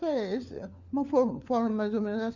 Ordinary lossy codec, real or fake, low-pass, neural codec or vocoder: Opus, 64 kbps; fake; 7.2 kHz; codec, 16 kHz, 4 kbps, FunCodec, trained on LibriTTS, 50 frames a second